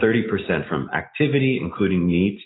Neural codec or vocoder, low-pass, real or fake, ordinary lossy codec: none; 7.2 kHz; real; AAC, 16 kbps